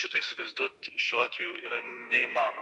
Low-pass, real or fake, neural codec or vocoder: 10.8 kHz; fake; autoencoder, 48 kHz, 32 numbers a frame, DAC-VAE, trained on Japanese speech